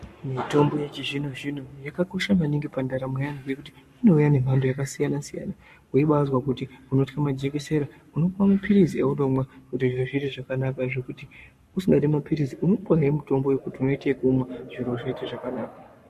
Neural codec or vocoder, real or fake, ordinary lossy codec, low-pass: codec, 44.1 kHz, 7.8 kbps, Pupu-Codec; fake; MP3, 64 kbps; 14.4 kHz